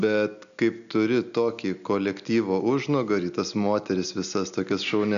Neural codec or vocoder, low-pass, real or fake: none; 7.2 kHz; real